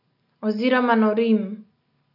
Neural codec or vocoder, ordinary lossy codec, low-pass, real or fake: none; none; 5.4 kHz; real